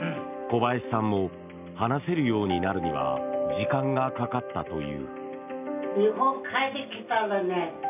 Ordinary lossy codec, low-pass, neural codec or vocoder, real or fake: none; 3.6 kHz; none; real